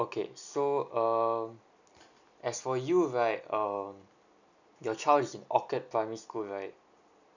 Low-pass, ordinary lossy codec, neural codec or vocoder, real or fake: 7.2 kHz; none; none; real